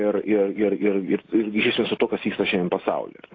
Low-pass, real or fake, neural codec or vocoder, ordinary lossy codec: 7.2 kHz; real; none; AAC, 32 kbps